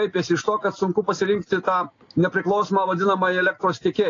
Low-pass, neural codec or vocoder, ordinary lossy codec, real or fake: 7.2 kHz; none; AAC, 32 kbps; real